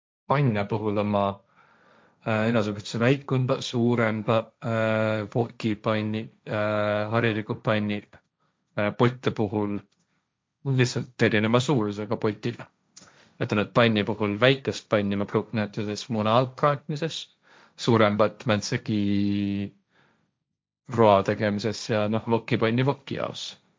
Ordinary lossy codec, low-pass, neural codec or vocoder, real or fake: none; none; codec, 16 kHz, 1.1 kbps, Voila-Tokenizer; fake